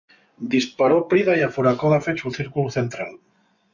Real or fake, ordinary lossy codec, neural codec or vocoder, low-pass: fake; MP3, 48 kbps; vocoder, 24 kHz, 100 mel bands, Vocos; 7.2 kHz